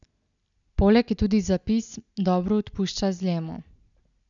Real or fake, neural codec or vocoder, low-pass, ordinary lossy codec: real; none; 7.2 kHz; none